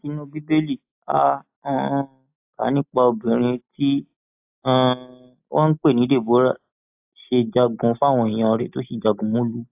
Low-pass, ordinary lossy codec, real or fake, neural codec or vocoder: 3.6 kHz; AAC, 32 kbps; real; none